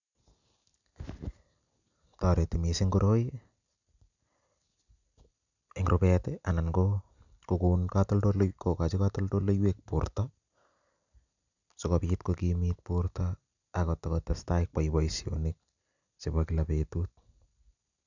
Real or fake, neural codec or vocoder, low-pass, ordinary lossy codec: real; none; 7.2 kHz; none